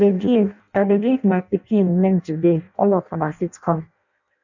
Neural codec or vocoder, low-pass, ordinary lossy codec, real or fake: codec, 16 kHz in and 24 kHz out, 0.6 kbps, FireRedTTS-2 codec; 7.2 kHz; none; fake